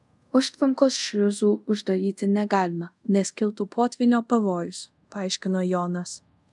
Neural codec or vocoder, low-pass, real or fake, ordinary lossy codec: codec, 24 kHz, 0.5 kbps, DualCodec; 10.8 kHz; fake; MP3, 96 kbps